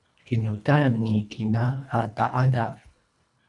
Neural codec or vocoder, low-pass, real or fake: codec, 24 kHz, 1.5 kbps, HILCodec; 10.8 kHz; fake